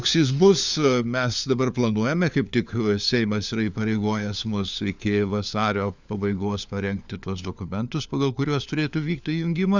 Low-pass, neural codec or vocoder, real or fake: 7.2 kHz; codec, 16 kHz, 4 kbps, FunCodec, trained on LibriTTS, 50 frames a second; fake